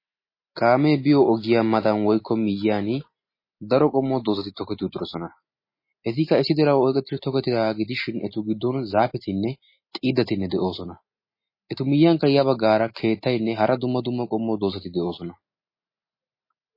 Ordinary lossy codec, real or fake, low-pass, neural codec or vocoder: MP3, 24 kbps; real; 5.4 kHz; none